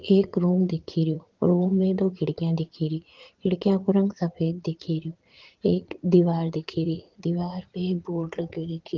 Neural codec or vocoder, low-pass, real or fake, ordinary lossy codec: vocoder, 44.1 kHz, 80 mel bands, Vocos; 7.2 kHz; fake; Opus, 16 kbps